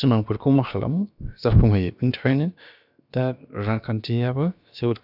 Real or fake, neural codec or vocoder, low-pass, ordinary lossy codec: fake; codec, 16 kHz, about 1 kbps, DyCAST, with the encoder's durations; 5.4 kHz; none